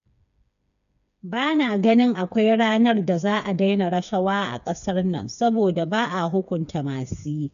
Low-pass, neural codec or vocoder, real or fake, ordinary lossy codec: 7.2 kHz; codec, 16 kHz, 4 kbps, FreqCodec, smaller model; fake; none